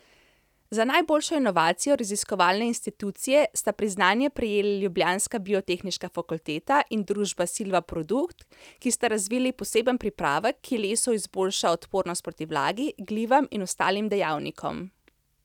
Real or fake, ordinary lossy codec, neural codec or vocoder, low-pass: real; none; none; 19.8 kHz